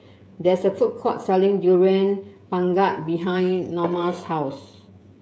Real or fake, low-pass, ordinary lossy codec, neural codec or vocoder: fake; none; none; codec, 16 kHz, 16 kbps, FreqCodec, smaller model